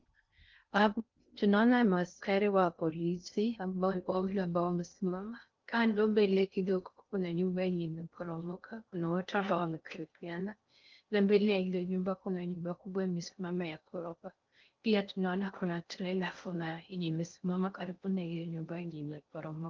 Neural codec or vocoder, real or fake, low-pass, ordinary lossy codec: codec, 16 kHz in and 24 kHz out, 0.6 kbps, FocalCodec, streaming, 2048 codes; fake; 7.2 kHz; Opus, 32 kbps